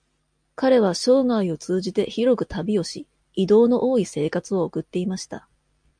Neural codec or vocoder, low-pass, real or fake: none; 9.9 kHz; real